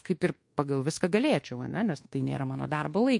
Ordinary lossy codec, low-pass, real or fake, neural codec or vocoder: MP3, 48 kbps; 10.8 kHz; fake; codec, 24 kHz, 1.2 kbps, DualCodec